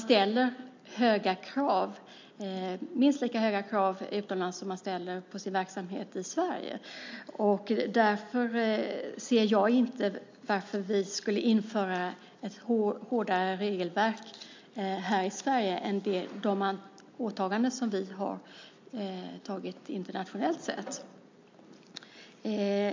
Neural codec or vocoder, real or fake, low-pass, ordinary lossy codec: none; real; 7.2 kHz; MP3, 64 kbps